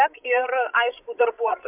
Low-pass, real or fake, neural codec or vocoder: 3.6 kHz; fake; codec, 16 kHz, 16 kbps, FreqCodec, larger model